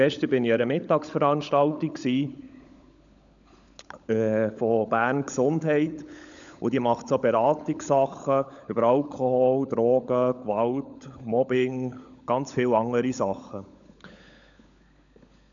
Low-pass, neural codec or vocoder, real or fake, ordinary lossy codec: 7.2 kHz; codec, 16 kHz, 16 kbps, FunCodec, trained on LibriTTS, 50 frames a second; fake; none